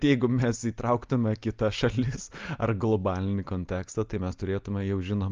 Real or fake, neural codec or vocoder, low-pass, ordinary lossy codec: real; none; 7.2 kHz; Opus, 32 kbps